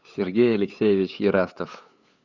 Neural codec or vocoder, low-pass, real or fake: codec, 16 kHz, 8 kbps, FunCodec, trained on LibriTTS, 25 frames a second; 7.2 kHz; fake